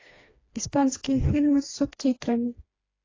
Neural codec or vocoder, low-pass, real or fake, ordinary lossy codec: codec, 16 kHz, 2 kbps, FreqCodec, smaller model; 7.2 kHz; fake; AAC, 32 kbps